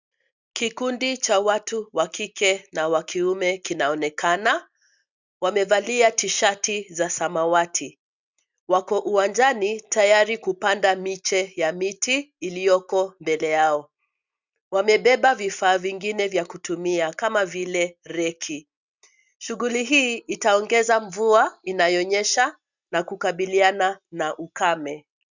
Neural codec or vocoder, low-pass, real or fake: none; 7.2 kHz; real